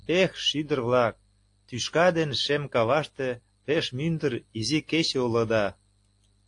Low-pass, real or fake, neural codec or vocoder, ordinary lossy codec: 10.8 kHz; real; none; AAC, 48 kbps